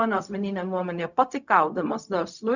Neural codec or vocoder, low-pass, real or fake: codec, 16 kHz, 0.4 kbps, LongCat-Audio-Codec; 7.2 kHz; fake